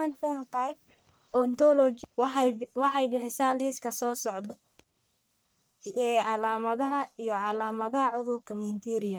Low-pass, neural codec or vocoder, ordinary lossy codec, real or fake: none; codec, 44.1 kHz, 1.7 kbps, Pupu-Codec; none; fake